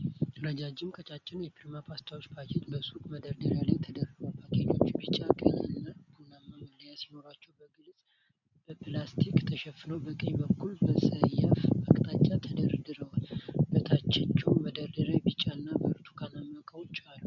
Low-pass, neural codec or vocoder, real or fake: 7.2 kHz; none; real